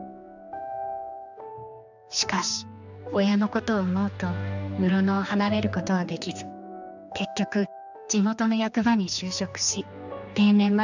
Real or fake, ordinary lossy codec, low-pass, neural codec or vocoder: fake; none; 7.2 kHz; codec, 16 kHz, 2 kbps, X-Codec, HuBERT features, trained on general audio